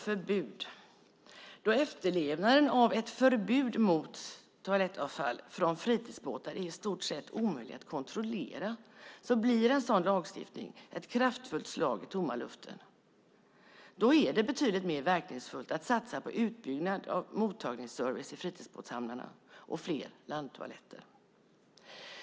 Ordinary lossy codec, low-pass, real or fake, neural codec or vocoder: none; none; real; none